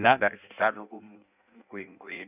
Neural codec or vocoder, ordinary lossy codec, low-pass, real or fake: codec, 16 kHz in and 24 kHz out, 0.6 kbps, FireRedTTS-2 codec; none; 3.6 kHz; fake